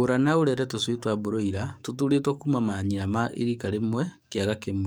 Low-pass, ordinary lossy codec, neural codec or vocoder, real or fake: none; none; codec, 44.1 kHz, 7.8 kbps, DAC; fake